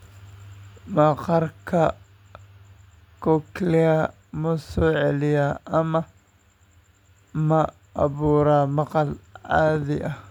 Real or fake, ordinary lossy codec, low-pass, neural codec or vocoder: real; none; 19.8 kHz; none